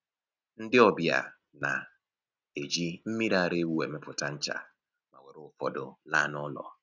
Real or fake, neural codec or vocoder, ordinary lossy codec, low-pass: real; none; none; 7.2 kHz